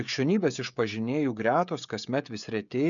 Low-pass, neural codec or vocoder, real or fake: 7.2 kHz; codec, 16 kHz, 16 kbps, FreqCodec, smaller model; fake